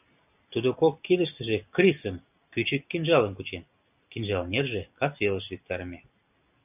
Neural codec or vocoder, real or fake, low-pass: none; real; 3.6 kHz